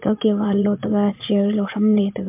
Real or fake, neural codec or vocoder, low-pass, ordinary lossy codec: fake; vocoder, 22.05 kHz, 80 mel bands, Vocos; 3.6 kHz; MP3, 32 kbps